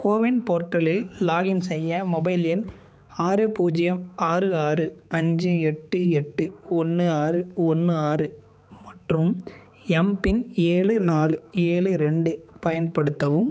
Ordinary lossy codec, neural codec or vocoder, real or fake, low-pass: none; codec, 16 kHz, 4 kbps, X-Codec, HuBERT features, trained on balanced general audio; fake; none